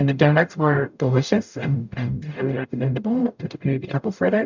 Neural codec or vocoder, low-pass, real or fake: codec, 44.1 kHz, 0.9 kbps, DAC; 7.2 kHz; fake